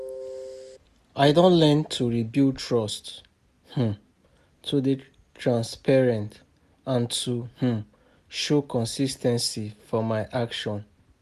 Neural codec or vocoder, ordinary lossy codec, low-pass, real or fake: none; none; 14.4 kHz; real